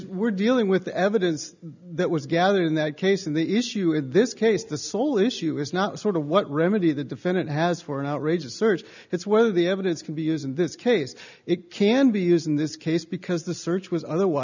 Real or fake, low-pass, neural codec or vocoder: real; 7.2 kHz; none